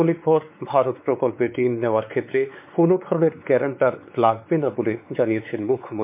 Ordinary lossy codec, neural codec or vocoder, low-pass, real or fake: MP3, 32 kbps; codec, 16 kHz, 4 kbps, X-Codec, WavLM features, trained on Multilingual LibriSpeech; 3.6 kHz; fake